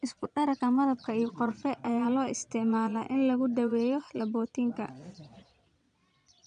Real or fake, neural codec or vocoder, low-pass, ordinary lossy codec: fake; vocoder, 22.05 kHz, 80 mel bands, Vocos; 9.9 kHz; none